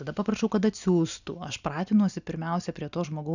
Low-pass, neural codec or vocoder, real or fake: 7.2 kHz; none; real